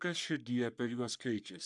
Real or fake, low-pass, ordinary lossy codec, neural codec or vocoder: fake; 10.8 kHz; MP3, 96 kbps; codec, 44.1 kHz, 3.4 kbps, Pupu-Codec